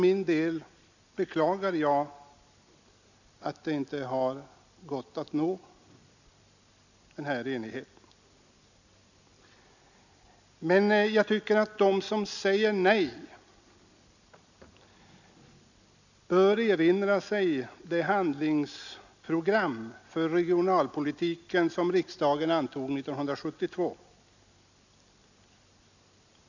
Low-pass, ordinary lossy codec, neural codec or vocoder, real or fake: 7.2 kHz; none; none; real